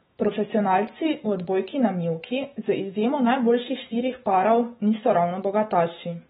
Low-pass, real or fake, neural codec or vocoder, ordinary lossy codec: 19.8 kHz; fake; autoencoder, 48 kHz, 128 numbers a frame, DAC-VAE, trained on Japanese speech; AAC, 16 kbps